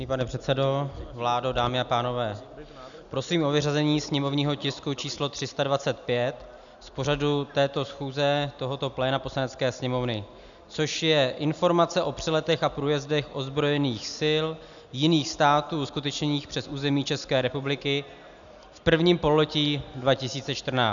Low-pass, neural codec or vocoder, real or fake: 7.2 kHz; none; real